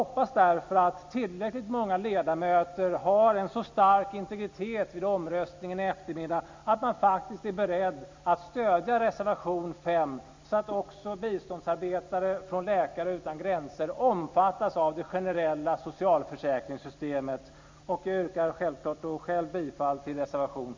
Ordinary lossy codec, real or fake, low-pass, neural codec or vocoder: MP3, 64 kbps; real; 7.2 kHz; none